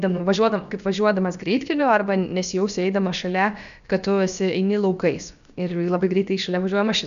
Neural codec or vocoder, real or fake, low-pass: codec, 16 kHz, about 1 kbps, DyCAST, with the encoder's durations; fake; 7.2 kHz